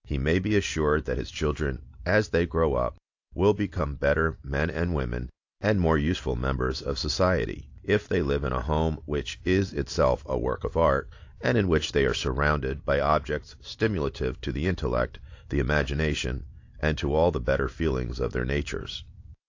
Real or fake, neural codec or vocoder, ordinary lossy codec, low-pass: real; none; AAC, 48 kbps; 7.2 kHz